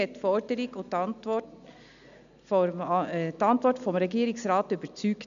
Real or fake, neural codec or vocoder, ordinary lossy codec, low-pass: real; none; none; 7.2 kHz